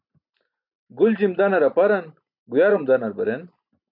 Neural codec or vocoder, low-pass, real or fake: none; 5.4 kHz; real